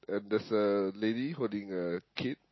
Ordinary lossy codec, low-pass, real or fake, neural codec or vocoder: MP3, 24 kbps; 7.2 kHz; real; none